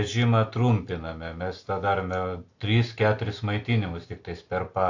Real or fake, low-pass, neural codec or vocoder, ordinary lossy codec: real; 7.2 kHz; none; AAC, 48 kbps